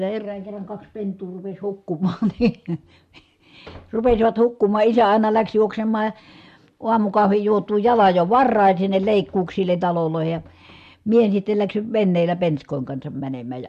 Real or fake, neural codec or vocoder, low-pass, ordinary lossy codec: real; none; 14.4 kHz; MP3, 64 kbps